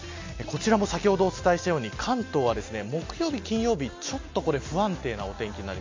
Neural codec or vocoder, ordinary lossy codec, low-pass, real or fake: none; MP3, 48 kbps; 7.2 kHz; real